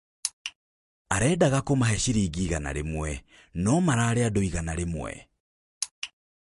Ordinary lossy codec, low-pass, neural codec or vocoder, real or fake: MP3, 48 kbps; 14.4 kHz; none; real